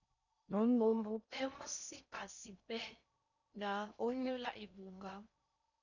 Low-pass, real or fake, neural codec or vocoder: 7.2 kHz; fake; codec, 16 kHz in and 24 kHz out, 0.6 kbps, FocalCodec, streaming, 4096 codes